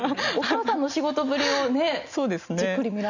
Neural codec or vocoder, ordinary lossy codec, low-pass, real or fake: none; none; 7.2 kHz; real